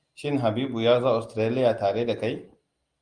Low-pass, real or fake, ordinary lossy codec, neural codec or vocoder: 9.9 kHz; real; Opus, 32 kbps; none